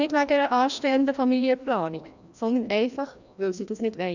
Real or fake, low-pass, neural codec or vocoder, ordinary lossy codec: fake; 7.2 kHz; codec, 16 kHz, 1 kbps, FreqCodec, larger model; none